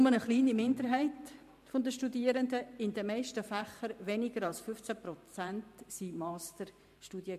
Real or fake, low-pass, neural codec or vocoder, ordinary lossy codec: fake; 14.4 kHz; vocoder, 44.1 kHz, 128 mel bands every 512 samples, BigVGAN v2; MP3, 64 kbps